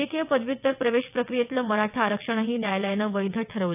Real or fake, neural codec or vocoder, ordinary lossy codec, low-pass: fake; vocoder, 22.05 kHz, 80 mel bands, WaveNeXt; none; 3.6 kHz